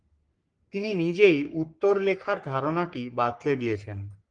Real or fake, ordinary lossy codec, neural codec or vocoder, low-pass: fake; Opus, 24 kbps; codec, 44.1 kHz, 3.4 kbps, Pupu-Codec; 9.9 kHz